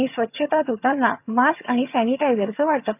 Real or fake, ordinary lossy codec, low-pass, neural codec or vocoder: fake; none; 3.6 kHz; vocoder, 22.05 kHz, 80 mel bands, HiFi-GAN